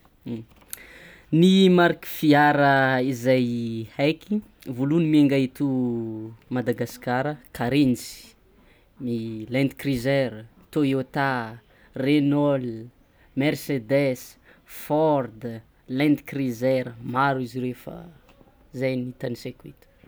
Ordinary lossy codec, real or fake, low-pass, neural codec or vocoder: none; real; none; none